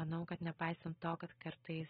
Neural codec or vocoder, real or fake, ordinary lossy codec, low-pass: none; real; AAC, 16 kbps; 19.8 kHz